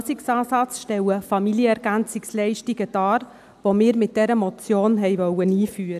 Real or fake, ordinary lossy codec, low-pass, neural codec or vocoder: real; none; 14.4 kHz; none